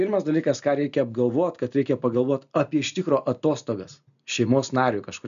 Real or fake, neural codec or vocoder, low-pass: real; none; 7.2 kHz